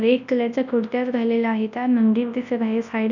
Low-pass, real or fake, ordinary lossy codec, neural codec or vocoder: 7.2 kHz; fake; none; codec, 24 kHz, 0.9 kbps, WavTokenizer, large speech release